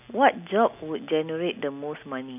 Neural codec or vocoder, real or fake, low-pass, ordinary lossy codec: none; real; 3.6 kHz; none